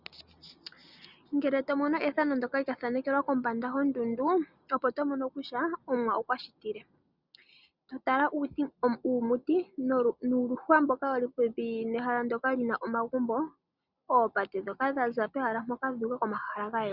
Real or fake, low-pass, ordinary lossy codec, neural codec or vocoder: real; 5.4 kHz; Opus, 64 kbps; none